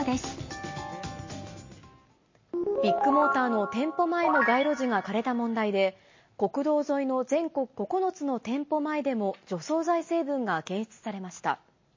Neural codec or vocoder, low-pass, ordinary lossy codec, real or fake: none; 7.2 kHz; MP3, 32 kbps; real